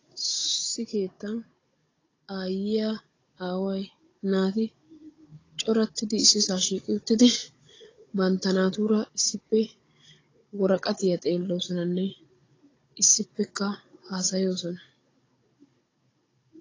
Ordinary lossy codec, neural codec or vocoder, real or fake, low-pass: AAC, 32 kbps; codec, 16 kHz, 16 kbps, FunCodec, trained on Chinese and English, 50 frames a second; fake; 7.2 kHz